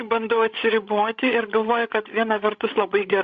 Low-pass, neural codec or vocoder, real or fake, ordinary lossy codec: 7.2 kHz; codec, 16 kHz, 16 kbps, FreqCodec, larger model; fake; Opus, 64 kbps